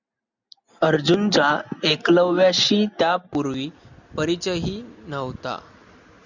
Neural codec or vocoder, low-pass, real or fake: none; 7.2 kHz; real